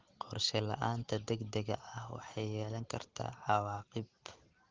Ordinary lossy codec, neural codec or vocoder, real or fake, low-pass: Opus, 16 kbps; none; real; 7.2 kHz